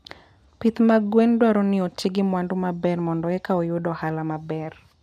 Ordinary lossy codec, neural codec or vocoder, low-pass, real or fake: none; none; 14.4 kHz; real